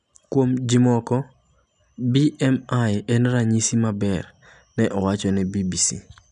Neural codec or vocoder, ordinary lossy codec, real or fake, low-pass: none; none; real; 10.8 kHz